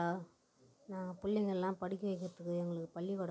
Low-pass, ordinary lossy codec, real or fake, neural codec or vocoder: none; none; real; none